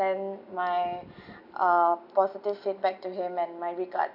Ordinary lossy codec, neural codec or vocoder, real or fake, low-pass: none; none; real; 5.4 kHz